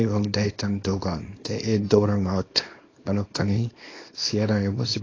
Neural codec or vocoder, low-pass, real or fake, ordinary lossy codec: codec, 24 kHz, 0.9 kbps, WavTokenizer, small release; 7.2 kHz; fake; AAC, 32 kbps